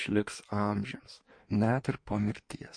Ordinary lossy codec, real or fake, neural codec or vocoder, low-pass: MP3, 48 kbps; fake; codec, 16 kHz in and 24 kHz out, 1.1 kbps, FireRedTTS-2 codec; 9.9 kHz